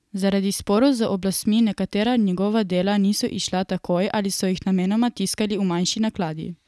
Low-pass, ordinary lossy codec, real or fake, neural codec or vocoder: none; none; real; none